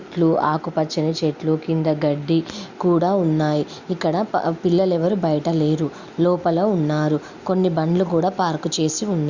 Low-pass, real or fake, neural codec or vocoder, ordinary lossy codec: 7.2 kHz; real; none; Opus, 64 kbps